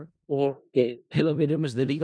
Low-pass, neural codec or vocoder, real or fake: 9.9 kHz; codec, 16 kHz in and 24 kHz out, 0.4 kbps, LongCat-Audio-Codec, four codebook decoder; fake